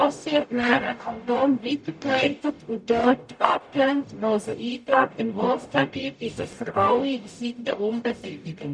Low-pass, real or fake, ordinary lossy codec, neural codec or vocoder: 9.9 kHz; fake; none; codec, 44.1 kHz, 0.9 kbps, DAC